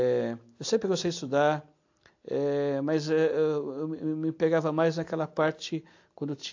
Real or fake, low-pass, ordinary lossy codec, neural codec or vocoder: real; 7.2 kHz; AAC, 48 kbps; none